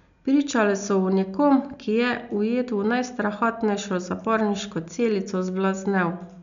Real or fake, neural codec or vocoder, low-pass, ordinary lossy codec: real; none; 7.2 kHz; none